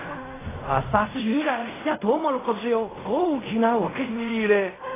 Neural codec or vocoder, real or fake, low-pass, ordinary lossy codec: codec, 16 kHz in and 24 kHz out, 0.4 kbps, LongCat-Audio-Codec, fine tuned four codebook decoder; fake; 3.6 kHz; AAC, 16 kbps